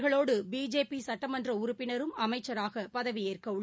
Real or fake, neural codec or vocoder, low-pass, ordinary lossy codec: real; none; none; none